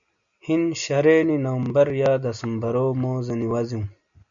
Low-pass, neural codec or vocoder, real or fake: 7.2 kHz; none; real